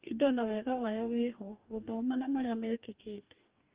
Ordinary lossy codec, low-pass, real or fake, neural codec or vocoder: Opus, 32 kbps; 3.6 kHz; fake; codec, 44.1 kHz, 2.6 kbps, DAC